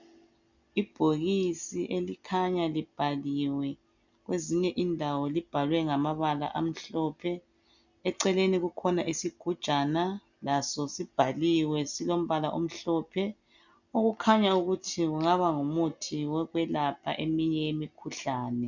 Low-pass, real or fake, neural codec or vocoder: 7.2 kHz; real; none